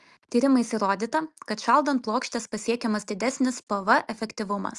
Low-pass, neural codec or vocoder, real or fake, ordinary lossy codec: 10.8 kHz; none; real; Opus, 32 kbps